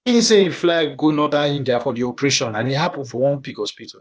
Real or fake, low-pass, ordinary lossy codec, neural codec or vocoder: fake; none; none; codec, 16 kHz, 0.8 kbps, ZipCodec